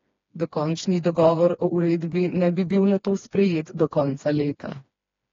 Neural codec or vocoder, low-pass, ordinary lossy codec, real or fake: codec, 16 kHz, 2 kbps, FreqCodec, smaller model; 7.2 kHz; AAC, 32 kbps; fake